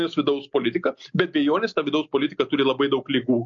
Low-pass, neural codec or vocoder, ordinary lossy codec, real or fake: 7.2 kHz; none; MP3, 48 kbps; real